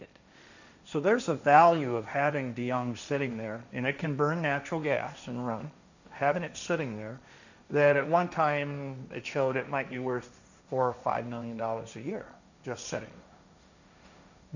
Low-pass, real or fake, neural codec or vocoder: 7.2 kHz; fake; codec, 16 kHz, 1.1 kbps, Voila-Tokenizer